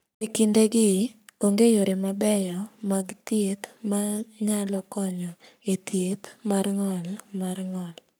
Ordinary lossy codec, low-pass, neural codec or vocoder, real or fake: none; none; codec, 44.1 kHz, 3.4 kbps, Pupu-Codec; fake